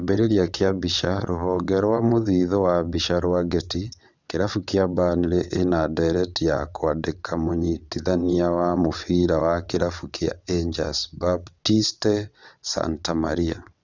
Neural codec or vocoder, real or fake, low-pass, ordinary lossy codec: vocoder, 22.05 kHz, 80 mel bands, WaveNeXt; fake; 7.2 kHz; none